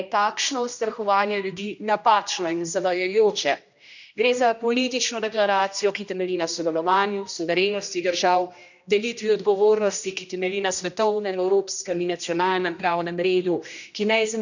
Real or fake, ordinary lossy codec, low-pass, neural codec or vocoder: fake; none; 7.2 kHz; codec, 16 kHz, 1 kbps, X-Codec, HuBERT features, trained on general audio